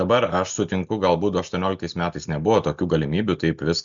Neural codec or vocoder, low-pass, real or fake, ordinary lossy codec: none; 7.2 kHz; real; Opus, 64 kbps